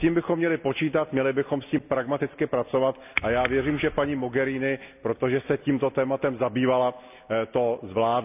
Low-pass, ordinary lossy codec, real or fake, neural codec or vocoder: 3.6 kHz; none; real; none